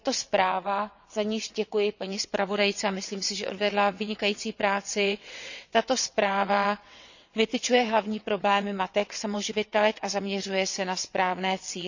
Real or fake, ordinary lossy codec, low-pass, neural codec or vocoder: fake; none; 7.2 kHz; vocoder, 22.05 kHz, 80 mel bands, WaveNeXt